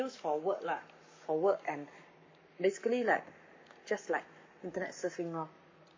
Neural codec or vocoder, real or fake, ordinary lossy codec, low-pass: codec, 44.1 kHz, 7.8 kbps, Pupu-Codec; fake; MP3, 32 kbps; 7.2 kHz